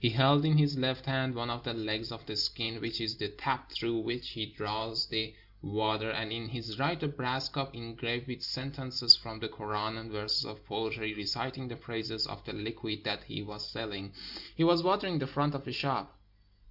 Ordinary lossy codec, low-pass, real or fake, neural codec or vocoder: Opus, 64 kbps; 5.4 kHz; real; none